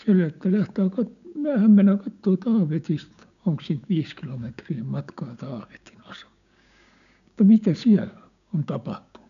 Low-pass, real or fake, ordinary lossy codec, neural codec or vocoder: 7.2 kHz; fake; none; codec, 16 kHz, 6 kbps, DAC